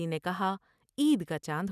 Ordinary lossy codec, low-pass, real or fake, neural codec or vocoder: none; 14.4 kHz; real; none